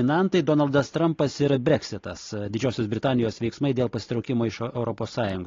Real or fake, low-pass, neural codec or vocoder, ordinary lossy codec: real; 7.2 kHz; none; AAC, 32 kbps